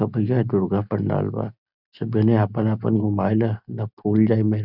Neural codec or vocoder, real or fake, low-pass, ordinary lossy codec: none; real; 5.4 kHz; none